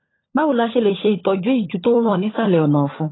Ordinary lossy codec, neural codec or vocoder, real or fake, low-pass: AAC, 16 kbps; codec, 16 kHz, 16 kbps, FunCodec, trained on LibriTTS, 50 frames a second; fake; 7.2 kHz